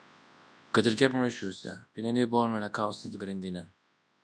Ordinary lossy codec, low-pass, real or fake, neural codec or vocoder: MP3, 96 kbps; 9.9 kHz; fake; codec, 24 kHz, 0.9 kbps, WavTokenizer, large speech release